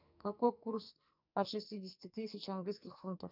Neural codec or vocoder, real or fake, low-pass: codec, 44.1 kHz, 2.6 kbps, SNAC; fake; 5.4 kHz